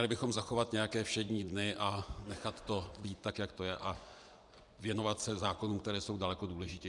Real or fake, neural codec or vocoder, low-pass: fake; vocoder, 24 kHz, 100 mel bands, Vocos; 10.8 kHz